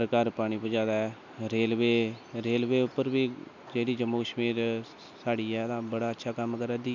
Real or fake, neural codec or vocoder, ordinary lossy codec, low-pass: real; none; none; 7.2 kHz